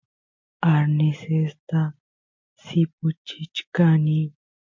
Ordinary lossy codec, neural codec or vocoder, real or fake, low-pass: MP3, 48 kbps; none; real; 7.2 kHz